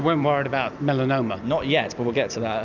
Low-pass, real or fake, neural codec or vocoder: 7.2 kHz; real; none